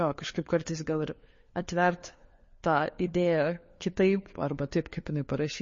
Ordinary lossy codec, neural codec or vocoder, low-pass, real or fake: MP3, 32 kbps; codec, 16 kHz, 4 kbps, FunCodec, trained on LibriTTS, 50 frames a second; 7.2 kHz; fake